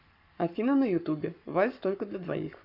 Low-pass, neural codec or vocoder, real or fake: 5.4 kHz; codec, 44.1 kHz, 7.8 kbps, Pupu-Codec; fake